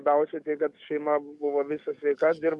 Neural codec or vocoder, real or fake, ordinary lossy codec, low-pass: codec, 44.1 kHz, 7.8 kbps, DAC; fake; MP3, 64 kbps; 10.8 kHz